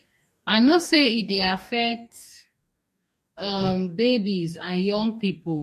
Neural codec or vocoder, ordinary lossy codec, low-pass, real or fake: codec, 44.1 kHz, 2.6 kbps, DAC; MP3, 64 kbps; 14.4 kHz; fake